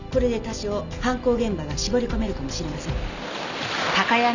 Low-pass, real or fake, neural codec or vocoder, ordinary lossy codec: 7.2 kHz; real; none; none